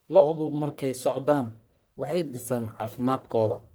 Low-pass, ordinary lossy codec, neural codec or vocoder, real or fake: none; none; codec, 44.1 kHz, 1.7 kbps, Pupu-Codec; fake